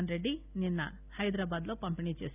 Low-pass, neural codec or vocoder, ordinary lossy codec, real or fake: 3.6 kHz; none; none; real